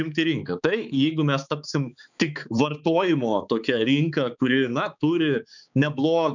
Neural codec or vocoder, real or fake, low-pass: codec, 16 kHz, 4 kbps, X-Codec, HuBERT features, trained on balanced general audio; fake; 7.2 kHz